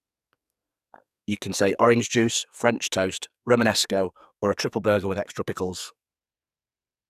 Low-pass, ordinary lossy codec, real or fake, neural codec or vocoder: 14.4 kHz; none; fake; codec, 44.1 kHz, 2.6 kbps, SNAC